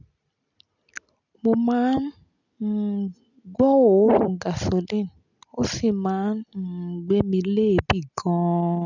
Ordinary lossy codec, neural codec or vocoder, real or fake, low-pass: none; none; real; 7.2 kHz